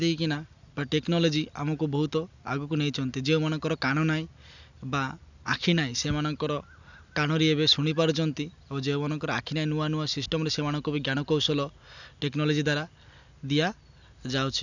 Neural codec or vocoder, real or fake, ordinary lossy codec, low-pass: none; real; none; 7.2 kHz